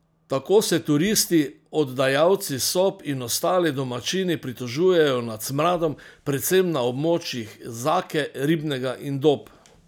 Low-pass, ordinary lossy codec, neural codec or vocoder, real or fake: none; none; none; real